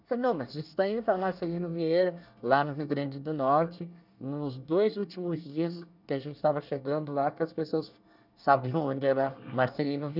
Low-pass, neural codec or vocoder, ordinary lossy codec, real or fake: 5.4 kHz; codec, 24 kHz, 1 kbps, SNAC; none; fake